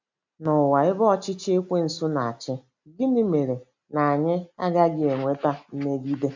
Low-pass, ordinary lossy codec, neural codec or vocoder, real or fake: 7.2 kHz; MP3, 64 kbps; none; real